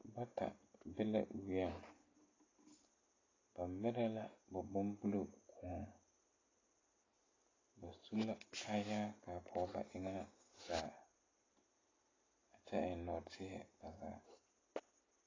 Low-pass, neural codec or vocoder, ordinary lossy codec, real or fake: 7.2 kHz; none; AAC, 32 kbps; real